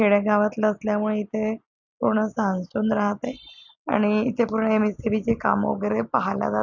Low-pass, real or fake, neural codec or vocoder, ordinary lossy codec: 7.2 kHz; real; none; none